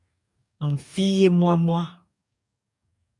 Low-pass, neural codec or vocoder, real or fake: 10.8 kHz; codec, 44.1 kHz, 2.6 kbps, DAC; fake